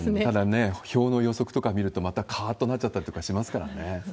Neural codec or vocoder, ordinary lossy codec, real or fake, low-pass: none; none; real; none